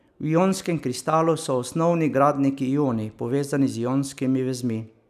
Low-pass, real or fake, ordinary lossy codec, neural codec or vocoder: 14.4 kHz; real; none; none